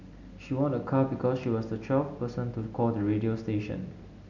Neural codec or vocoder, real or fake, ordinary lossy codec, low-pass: none; real; none; 7.2 kHz